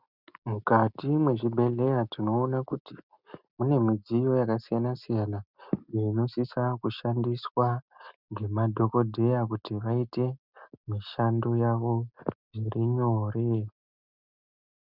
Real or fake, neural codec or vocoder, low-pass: real; none; 5.4 kHz